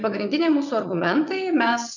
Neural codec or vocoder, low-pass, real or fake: vocoder, 22.05 kHz, 80 mel bands, WaveNeXt; 7.2 kHz; fake